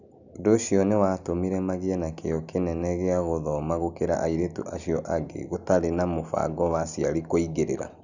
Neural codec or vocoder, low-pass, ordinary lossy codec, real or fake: none; 7.2 kHz; none; real